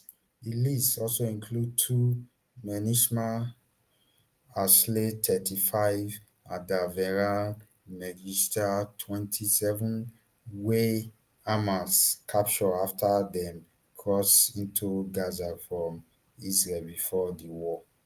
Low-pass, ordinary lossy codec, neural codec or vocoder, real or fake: 14.4 kHz; Opus, 32 kbps; none; real